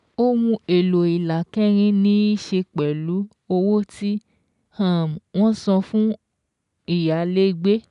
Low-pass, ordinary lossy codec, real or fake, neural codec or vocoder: 10.8 kHz; none; real; none